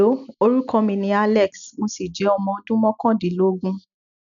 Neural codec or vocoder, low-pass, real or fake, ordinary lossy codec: none; 7.2 kHz; real; none